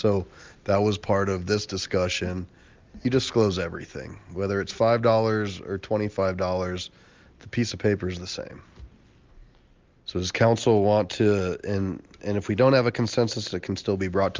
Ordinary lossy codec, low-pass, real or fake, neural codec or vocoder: Opus, 32 kbps; 7.2 kHz; real; none